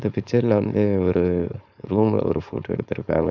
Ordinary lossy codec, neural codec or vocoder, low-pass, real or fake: none; codec, 16 kHz, 4.8 kbps, FACodec; 7.2 kHz; fake